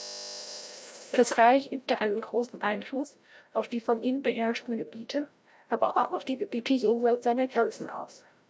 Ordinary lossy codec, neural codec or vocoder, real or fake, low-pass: none; codec, 16 kHz, 0.5 kbps, FreqCodec, larger model; fake; none